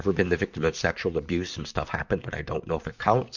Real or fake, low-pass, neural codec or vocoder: fake; 7.2 kHz; vocoder, 44.1 kHz, 128 mel bands, Pupu-Vocoder